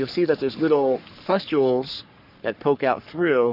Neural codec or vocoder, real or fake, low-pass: codec, 44.1 kHz, 3.4 kbps, Pupu-Codec; fake; 5.4 kHz